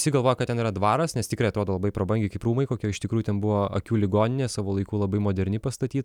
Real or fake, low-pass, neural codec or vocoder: real; 19.8 kHz; none